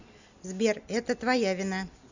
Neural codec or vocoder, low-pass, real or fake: none; 7.2 kHz; real